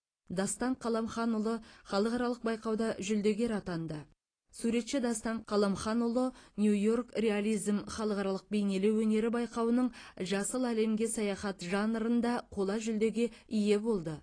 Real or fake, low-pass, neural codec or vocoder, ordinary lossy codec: real; 9.9 kHz; none; AAC, 32 kbps